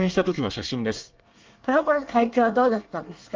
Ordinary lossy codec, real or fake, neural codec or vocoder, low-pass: Opus, 24 kbps; fake; codec, 24 kHz, 1 kbps, SNAC; 7.2 kHz